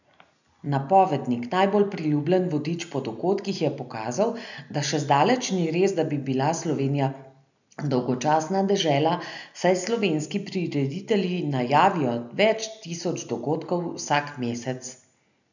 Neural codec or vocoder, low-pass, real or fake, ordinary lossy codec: none; 7.2 kHz; real; none